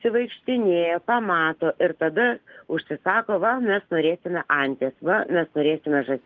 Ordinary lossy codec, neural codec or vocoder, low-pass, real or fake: Opus, 32 kbps; none; 7.2 kHz; real